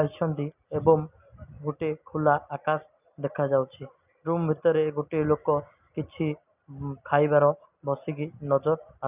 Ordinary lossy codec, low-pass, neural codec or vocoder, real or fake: none; 3.6 kHz; none; real